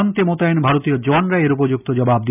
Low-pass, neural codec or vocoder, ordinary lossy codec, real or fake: 3.6 kHz; none; none; real